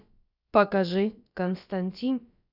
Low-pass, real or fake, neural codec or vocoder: 5.4 kHz; fake; codec, 16 kHz, about 1 kbps, DyCAST, with the encoder's durations